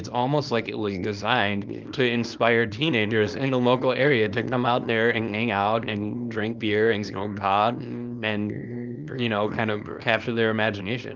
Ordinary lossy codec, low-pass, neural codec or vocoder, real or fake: Opus, 24 kbps; 7.2 kHz; codec, 24 kHz, 0.9 kbps, WavTokenizer, small release; fake